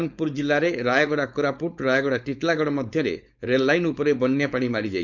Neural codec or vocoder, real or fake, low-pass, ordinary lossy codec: codec, 16 kHz, 4.8 kbps, FACodec; fake; 7.2 kHz; none